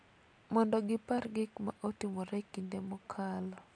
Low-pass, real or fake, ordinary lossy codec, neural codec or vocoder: 9.9 kHz; real; AAC, 48 kbps; none